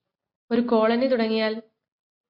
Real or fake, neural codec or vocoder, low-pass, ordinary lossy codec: real; none; 5.4 kHz; MP3, 32 kbps